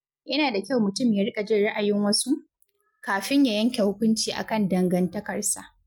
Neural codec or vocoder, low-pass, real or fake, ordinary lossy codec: none; 19.8 kHz; real; MP3, 96 kbps